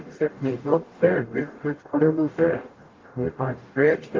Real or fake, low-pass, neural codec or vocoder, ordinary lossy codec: fake; 7.2 kHz; codec, 44.1 kHz, 0.9 kbps, DAC; Opus, 32 kbps